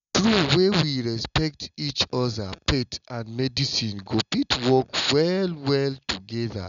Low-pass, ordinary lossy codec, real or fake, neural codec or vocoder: 7.2 kHz; none; real; none